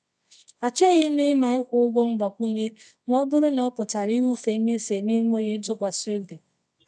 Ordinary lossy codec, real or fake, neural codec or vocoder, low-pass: none; fake; codec, 24 kHz, 0.9 kbps, WavTokenizer, medium music audio release; none